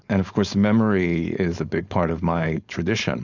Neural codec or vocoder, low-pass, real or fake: codec, 16 kHz, 4.8 kbps, FACodec; 7.2 kHz; fake